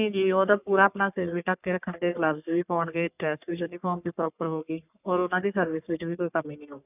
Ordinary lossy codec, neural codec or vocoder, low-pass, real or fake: none; codec, 44.1 kHz, 3.4 kbps, Pupu-Codec; 3.6 kHz; fake